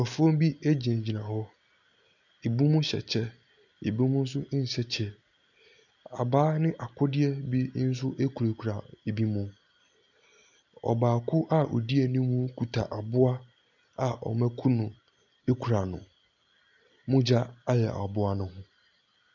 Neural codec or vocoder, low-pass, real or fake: none; 7.2 kHz; real